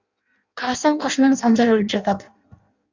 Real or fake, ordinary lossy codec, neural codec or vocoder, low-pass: fake; Opus, 64 kbps; codec, 16 kHz in and 24 kHz out, 0.6 kbps, FireRedTTS-2 codec; 7.2 kHz